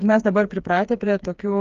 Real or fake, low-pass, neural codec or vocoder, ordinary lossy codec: fake; 7.2 kHz; codec, 16 kHz, 4 kbps, FreqCodec, smaller model; Opus, 32 kbps